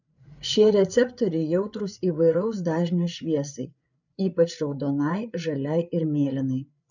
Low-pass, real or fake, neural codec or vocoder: 7.2 kHz; fake; codec, 16 kHz, 8 kbps, FreqCodec, larger model